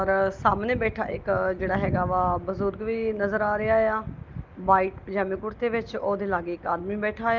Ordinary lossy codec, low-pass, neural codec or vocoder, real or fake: Opus, 32 kbps; 7.2 kHz; none; real